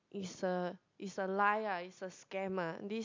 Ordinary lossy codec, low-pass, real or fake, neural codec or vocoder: MP3, 64 kbps; 7.2 kHz; real; none